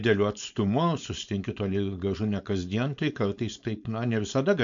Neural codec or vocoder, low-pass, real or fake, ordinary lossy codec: codec, 16 kHz, 4.8 kbps, FACodec; 7.2 kHz; fake; MP3, 64 kbps